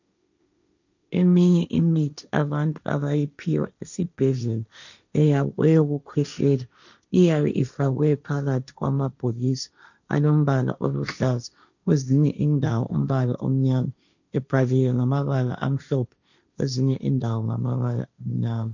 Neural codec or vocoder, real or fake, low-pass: codec, 16 kHz, 1.1 kbps, Voila-Tokenizer; fake; 7.2 kHz